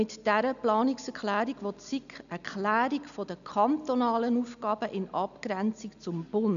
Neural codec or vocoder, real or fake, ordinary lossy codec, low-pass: none; real; none; 7.2 kHz